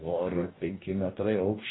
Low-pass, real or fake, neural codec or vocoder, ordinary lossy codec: 7.2 kHz; fake; codec, 44.1 kHz, 2.6 kbps, DAC; AAC, 16 kbps